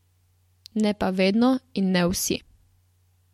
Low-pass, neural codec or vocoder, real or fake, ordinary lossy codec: 19.8 kHz; none; real; MP3, 64 kbps